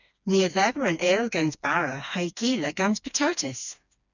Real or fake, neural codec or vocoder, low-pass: fake; codec, 16 kHz, 2 kbps, FreqCodec, smaller model; 7.2 kHz